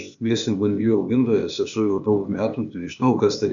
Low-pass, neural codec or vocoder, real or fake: 7.2 kHz; codec, 16 kHz, 0.8 kbps, ZipCodec; fake